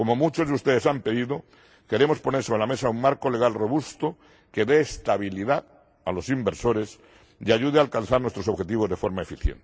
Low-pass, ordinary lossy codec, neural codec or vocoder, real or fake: none; none; none; real